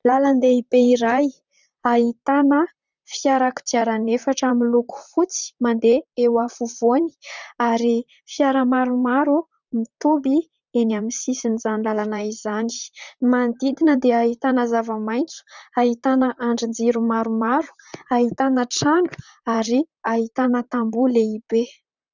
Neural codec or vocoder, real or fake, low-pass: vocoder, 44.1 kHz, 128 mel bands, Pupu-Vocoder; fake; 7.2 kHz